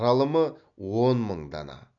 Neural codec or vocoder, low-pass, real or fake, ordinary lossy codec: none; 7.2 kHz; real; none